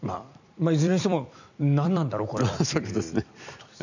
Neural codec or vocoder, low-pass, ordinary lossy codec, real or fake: vocoder, 44.1 kHz, 128 mel bands every 256 samples, BigVGAN v2; 7.2 kHz; none; fake